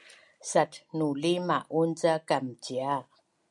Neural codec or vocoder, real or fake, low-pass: none; real; 10.8 kHz